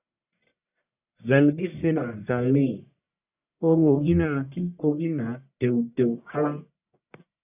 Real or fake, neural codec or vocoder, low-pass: fake; codec, 44.1 kHz, 1.7 kbps, Pupu-Codec; 3.6 kHz